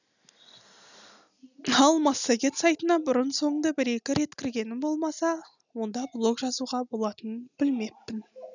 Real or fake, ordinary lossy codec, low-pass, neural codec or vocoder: real; none; 7.2 kHz; none